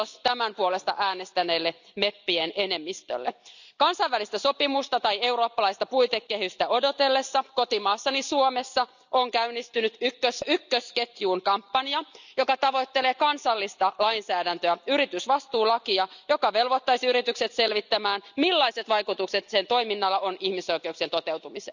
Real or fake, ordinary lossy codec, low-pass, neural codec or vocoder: real; none; 7.2 kHz; none